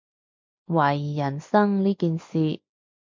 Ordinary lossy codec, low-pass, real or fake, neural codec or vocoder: MP3, 48 kbps; 7.2 kHz; real; none